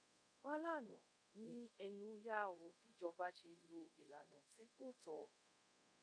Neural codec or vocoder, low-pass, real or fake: codec, 24 kHz, 0.5 kbps, DualCodec; 9.9 kHz; fake